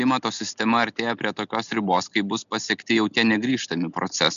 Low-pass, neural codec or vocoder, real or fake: 7.2 kHz; none; real